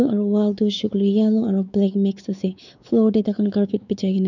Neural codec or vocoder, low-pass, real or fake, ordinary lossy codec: codec, 16 kHz, 4 kbps, X-Codec, WavLM features, trained on Multilingual LibriSpeech; 7.2 kHz; fake; none